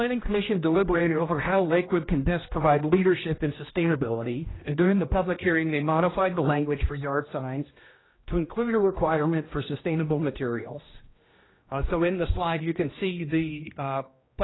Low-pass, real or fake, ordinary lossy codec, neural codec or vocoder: 7.2 kHz; fake; AAC, 16 kbps; codec, 16 kHz, 1 kbps, X-Codec, HuBERT features, trained on general audio